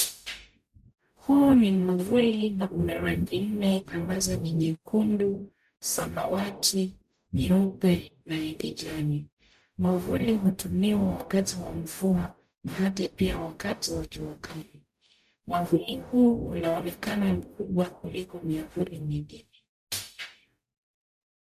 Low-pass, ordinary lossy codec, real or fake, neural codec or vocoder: 14.4 kHz; none; fake; codec, 44.1 kHz, 0.9 kbps, DAC